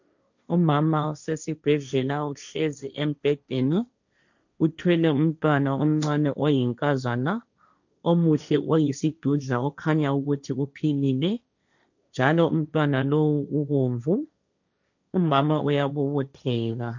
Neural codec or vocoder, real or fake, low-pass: codec, 16 kHz, 1.1 kbps, Voila-Tokenizer; fake; 7.2 kHz